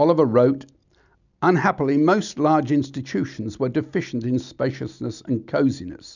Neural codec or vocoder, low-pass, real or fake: none; 7.2 kHz; real